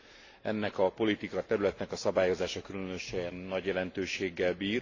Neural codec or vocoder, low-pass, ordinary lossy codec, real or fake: none; 7.2 kHz; AAC, 32 kbps; real